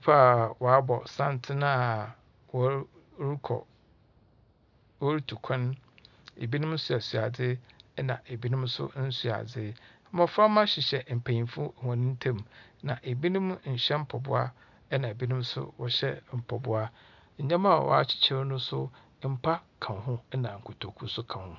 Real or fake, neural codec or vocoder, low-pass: real; none; 7.2 kHz